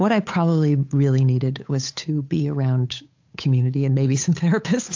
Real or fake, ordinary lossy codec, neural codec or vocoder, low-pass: fake; AAC, 48 kbps; codec, 16 kHz, 8 kbps, FunCodec, trained on Chinese and English, 25 frames a second; 7.2 kHz